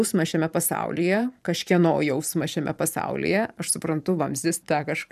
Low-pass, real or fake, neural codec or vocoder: 14.4 kHz; fake; vocoder, 44.1 kHz, 128 mel bands every 512 samples, BigVGAN v2